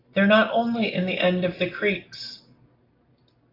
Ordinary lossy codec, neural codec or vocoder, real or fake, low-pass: AAC, 24 kbps; none; real; 5.4 kHz